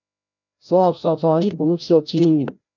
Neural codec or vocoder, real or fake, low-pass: codec, 16 kHz, 0.5 kbps, FreqCodec, larger model; fake; 7.2 kHz